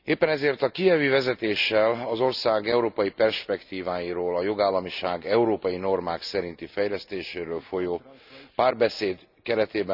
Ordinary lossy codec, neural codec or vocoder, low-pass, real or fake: none; none; 5.4 kHz; real